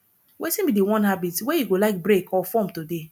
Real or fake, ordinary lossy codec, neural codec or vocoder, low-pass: real; none; none; 19.8 kHz